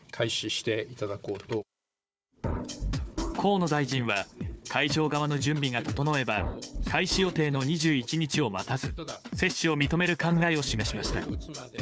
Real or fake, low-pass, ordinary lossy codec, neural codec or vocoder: fake; none; none; codec, 16 kHz, 4 kbps, FunCodec, trained on Chinese and English, 50 frames a second